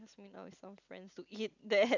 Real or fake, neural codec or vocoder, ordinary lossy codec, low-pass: real; none; none; 7.2 kHz